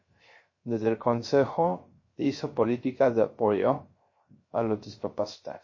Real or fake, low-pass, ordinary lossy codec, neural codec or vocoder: fake; 7.2 kHz; MP3, 32 kbps; codec, 16 kHz, 0.3 kbps, FocalCodec